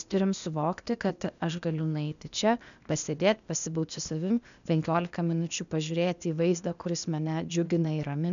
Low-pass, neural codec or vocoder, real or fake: 7.2 kHz; codec, 16 kHz, 0.8 kbps, ZipCodec; fake